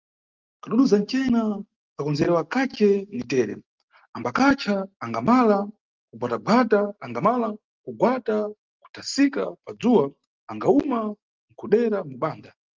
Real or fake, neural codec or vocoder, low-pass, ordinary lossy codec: real; none; 7.2 kHz; Opus, 32 kbps